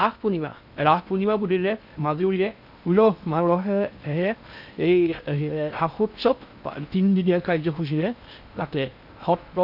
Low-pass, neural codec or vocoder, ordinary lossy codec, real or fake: 5.4 kHz; codec, 16 kHz in and 24 kHz out, 0.6 kbps, FocalCodec, streaming, 2048 codes; AAC, 48 kbps; fake